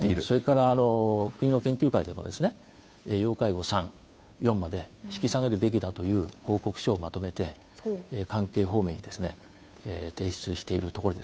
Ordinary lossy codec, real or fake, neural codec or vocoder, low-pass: none; fake; codec, 16 kHz, 2 kbps, FunCodec, trained on Chinese and English, 25 frames a second; none